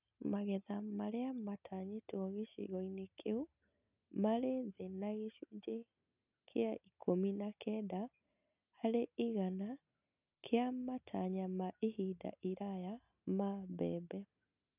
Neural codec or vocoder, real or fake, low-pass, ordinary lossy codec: none; real; 3.6 kHz; none